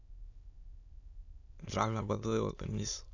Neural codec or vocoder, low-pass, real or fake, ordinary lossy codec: autoencoder, 22.05 kHz, a latent of 192 numbers a frame, VITS, trained on many speakers; 7.2 kHz; fake; none